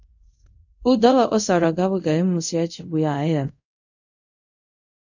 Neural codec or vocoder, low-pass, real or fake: codec, 24 kHz, 0.5 kbps, DualCodec; 7.2 kHz; fake